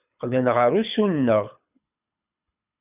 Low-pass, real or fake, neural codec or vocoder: 3.6 kHz; fake; codec, 24 kHz, 6 kbps, HILCodec